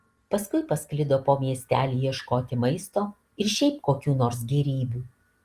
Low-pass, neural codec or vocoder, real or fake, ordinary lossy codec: 14.4 kHz; none; real; Opus, 24 kbps